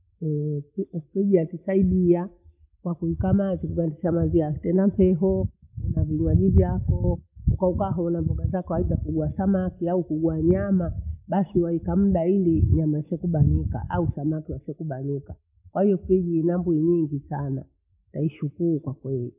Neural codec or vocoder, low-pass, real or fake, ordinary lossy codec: none; 3.6 kHz; real; none